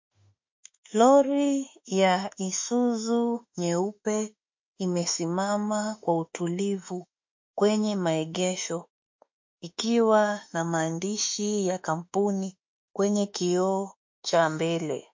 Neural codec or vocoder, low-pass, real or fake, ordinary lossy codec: autoencoder, 48 kHz, 32 numbers a frame, DAC-VAE, trained on Japanese speech; 7.2 kHz; fake; MP3, 48 kbps